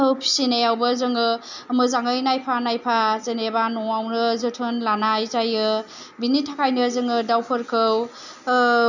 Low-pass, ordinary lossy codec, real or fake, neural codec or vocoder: 7.2 kHz; none; real; none